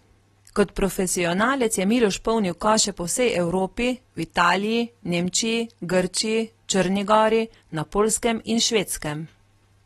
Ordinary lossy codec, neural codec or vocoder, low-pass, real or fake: AAC, 32 kbps; none; 19.8 kHz; real